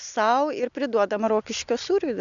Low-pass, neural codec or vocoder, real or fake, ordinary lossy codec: 7.2 kHz; none; real; MP3, 96 kbps